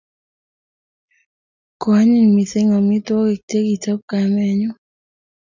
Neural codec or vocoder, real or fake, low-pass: none; real; 7.2 kHz